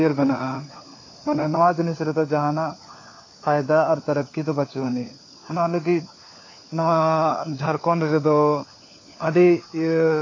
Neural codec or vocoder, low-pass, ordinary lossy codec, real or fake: codec, 16 kHz, 4 kbps, FunCodec, trained on LibriTTS, 50 frames a second; 7.2 kHz; AAC, 32 kbps; fake